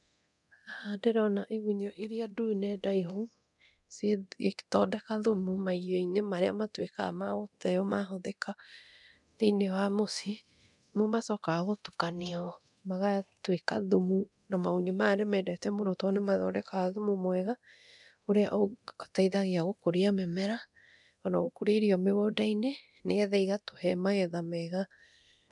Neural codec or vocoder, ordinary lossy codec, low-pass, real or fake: codec, 24 kHz, 0.9 kbps, DualCodec; none; 10.8 kHz; fake